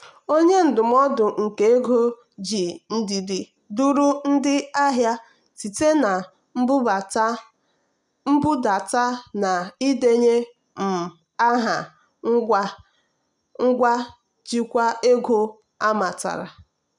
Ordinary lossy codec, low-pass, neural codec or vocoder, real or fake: none; 10.8 kHz; none; real